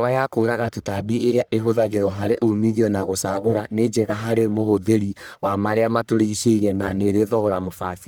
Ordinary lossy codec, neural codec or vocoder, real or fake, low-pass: none; codec, 44.1 kHz, 1.7 kbps, Pupu-Codec; fake; none